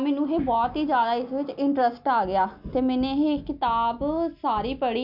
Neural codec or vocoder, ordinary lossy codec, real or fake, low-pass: none; none; real; 5.4 kHz